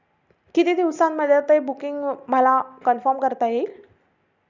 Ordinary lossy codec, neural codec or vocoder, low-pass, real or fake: none; none; 7.2 kHz; real